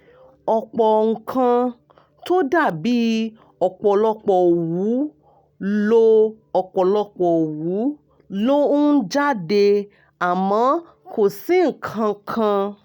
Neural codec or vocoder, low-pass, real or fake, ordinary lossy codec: none; 19.8 kHz; real; none